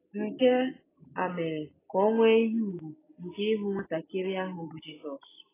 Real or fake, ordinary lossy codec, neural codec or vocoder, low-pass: real; AAC, 16 kbps; none; 3.6 kHz